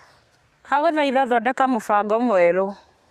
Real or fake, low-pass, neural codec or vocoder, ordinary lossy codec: fake; 14.4 kHz; codec, 32 kHz, 1.9 kbps, SNAC; Opus, 64 kbps